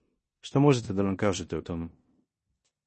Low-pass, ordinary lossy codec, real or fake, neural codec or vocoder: 10.8 kHz; MP3, 32 kbps; fake; codec, 16 kHz in and 24 kHz out, 0.9 kbps, LongCat-Audio-Codec, four codebook decoder